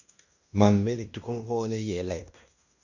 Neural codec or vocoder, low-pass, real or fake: codec, 16 kHz in and 24 kHz out, 0.9 kbps, LongCat-Audio-Codec, fine tuned four codebook decoder; 7.2 kHz; fake